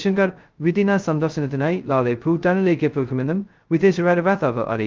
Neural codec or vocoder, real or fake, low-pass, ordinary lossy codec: codec, 16 kHz, 0.2 kbps, FocalCodec; fake; 7.2 kHz; Opus, 32 kbps